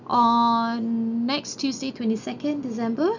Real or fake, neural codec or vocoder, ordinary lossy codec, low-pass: real; none; none; 7.2 kHz